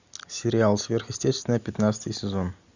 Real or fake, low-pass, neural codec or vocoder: real; 7.2 kHz; none